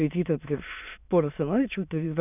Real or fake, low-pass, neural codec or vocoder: fake; 3.6 kHz; autoencoder, 22.05 kHz, a latent of 192 numbers a frame, VITS, trained on many speakers